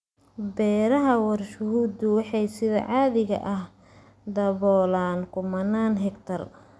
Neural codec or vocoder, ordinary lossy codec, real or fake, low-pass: none; none; real; none